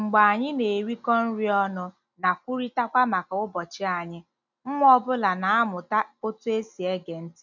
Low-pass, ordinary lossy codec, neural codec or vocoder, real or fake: 7.2 kHz; none; none; real